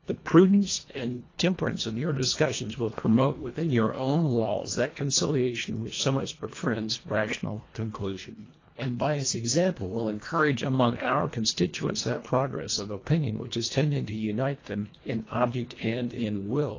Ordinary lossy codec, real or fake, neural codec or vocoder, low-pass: AAC, 32 kbps; fake; codec, 24 kHz, 1.5 kbps, HILCodec; 7.2 kHz